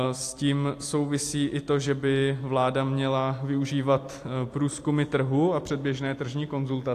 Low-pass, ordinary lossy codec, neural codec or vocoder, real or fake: 14.4 kHz; AAC, 64 kbps; vocoder, 44.1 kHz, 128 mel bands every 256 samples, BigVGAN v2; fake